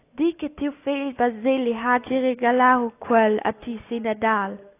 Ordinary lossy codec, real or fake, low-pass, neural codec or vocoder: AAC, 24 kbps; real; 3.6 kHz; none